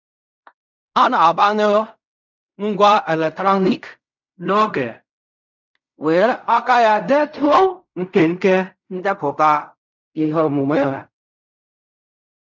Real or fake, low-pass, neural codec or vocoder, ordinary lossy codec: fake; 7.2 kHz; codec, 16 kHz in and 24 kHz out, 0.4 kbps, LongCat-Audio-Codec, fine tuned four codebook decoder; none